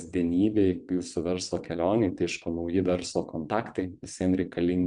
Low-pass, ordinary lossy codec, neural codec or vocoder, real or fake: 9.9 kHz; Opus, 64 kbps; vocoder, 22.05 kHz, 80 mel bands, WaveNeXt; fake